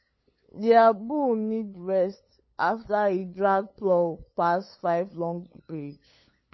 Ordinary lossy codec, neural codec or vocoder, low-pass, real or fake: MP3, 24 kbps; codec, 16 kHz, 8 kbps, FunCodec, trained on LibriTTS, 25 frames a second; 7.2 kHz; fake